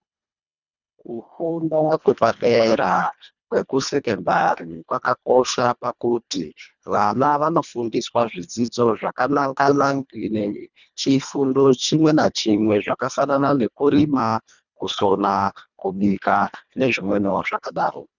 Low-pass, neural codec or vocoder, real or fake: 7.2 kHz; codec, 24 kHz, 1.5 kbps, HILCodec; fake